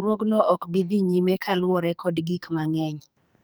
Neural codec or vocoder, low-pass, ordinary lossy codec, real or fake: codec, 44.1 kHz, 2.6 kbps, SNAC; none; none; fake